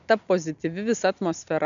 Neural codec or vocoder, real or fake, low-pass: none; real; 7.2 kHz